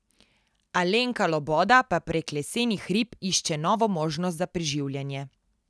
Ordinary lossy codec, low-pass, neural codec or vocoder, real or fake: none; none; none; real